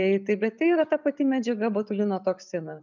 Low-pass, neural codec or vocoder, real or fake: 7.2 kHz; none; real